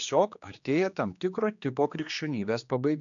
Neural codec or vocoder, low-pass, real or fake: codec, 16 kHz, 2 kbps, X-Codec, HuBERT features, trained on general audio; 7.2 kHz; fake